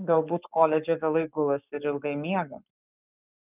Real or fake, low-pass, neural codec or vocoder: fake; 3.6 kHz; codec, 44.1 kHz, 7.8 kbps, Pupu-Codec